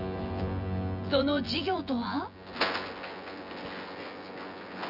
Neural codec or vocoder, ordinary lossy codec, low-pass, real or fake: vocoder, 24 kHz, 100 mel bands, Vocos; none; 5.4 kHz; fake